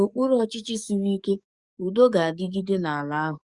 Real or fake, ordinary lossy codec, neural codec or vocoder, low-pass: fake; Opus, 64 kbps; codec, 44.1 kHz, 7.8 kbps, DAC; 10.8 kHz